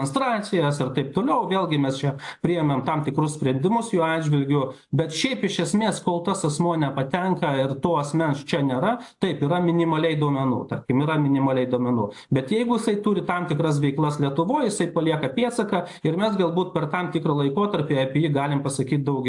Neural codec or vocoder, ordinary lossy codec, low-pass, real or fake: none; AAC, 64 kbps; 10.8 kHz; real